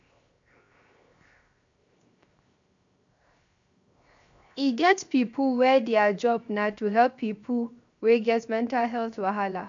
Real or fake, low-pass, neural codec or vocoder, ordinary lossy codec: fake; 7.2 kHz; codec, 16 kHz, 0.7 kbps, FocalCodec; AAC, 64 kbps